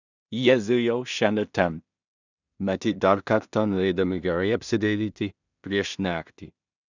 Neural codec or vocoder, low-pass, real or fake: codec, 16 kHz in and 24 kHz out, 0.4 kbps, LongCat-Audio-Codec, two codebook decoder; 7.2 kHz; fake